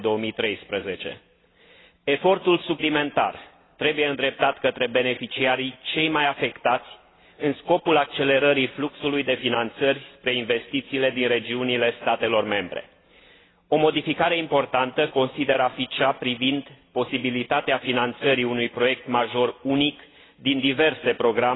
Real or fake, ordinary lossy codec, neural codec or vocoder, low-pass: real; AAC, 16 kbps; none; 7.2 kHz